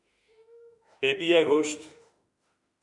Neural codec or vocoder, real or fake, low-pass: autoencoder, 48 kHz, 32 numbers a frame, DAC-VAE, trained on Japanese speech; fake; 10.8 kHz